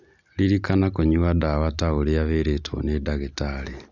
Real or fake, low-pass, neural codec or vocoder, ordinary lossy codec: real; 7.2 kHz; none; none